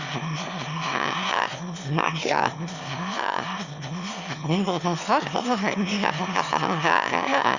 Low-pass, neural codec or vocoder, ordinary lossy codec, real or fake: 7.2 kHz; autoencoder, 22.05 kHz, a latent of 192 numbers a frame, VITS, trained on one speaker; Opus, 64 kbps; fake